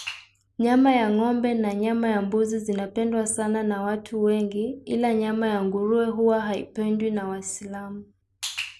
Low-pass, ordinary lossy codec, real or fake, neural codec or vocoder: none; none; real; none